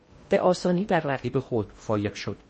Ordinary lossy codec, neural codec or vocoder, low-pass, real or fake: MP3, 32 kbps; codec, 16 kHz in and 24 kHz out, 0.6 kbps, FocalCodec, streaming, 2048 codes; 10.8 kHz; fake